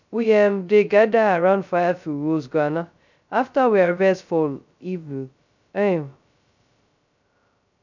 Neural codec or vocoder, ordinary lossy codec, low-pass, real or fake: codec, 16 kHz, 0.2 kbps, FocalCodec; none; 7.2 kHz; fake